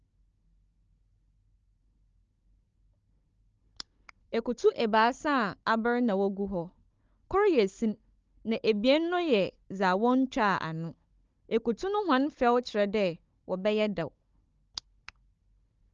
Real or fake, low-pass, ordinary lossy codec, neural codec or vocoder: fake; 7.2 kHz; Opus, 24 kbps; codec, 16 kHz, 16 kbps, FunCodec, trained on Chinese and English, 50 frames a second